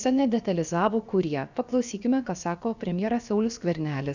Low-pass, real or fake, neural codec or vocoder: 7.2 kHz; fake; codec, 16 kHz, about 1 kbps, DyCAST, with the encoder's durations